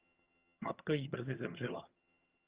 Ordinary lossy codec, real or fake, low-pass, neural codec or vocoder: Opus, 24 kbps; fake; 3.6 kHz; vocoder, 22.05 kHz, 80 mel bands, HiFi-GAN